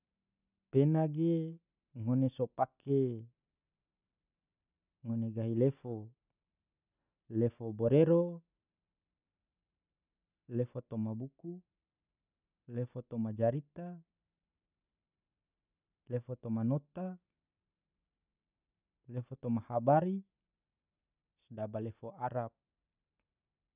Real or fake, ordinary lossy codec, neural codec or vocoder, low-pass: real; none; none; 3.6 kHz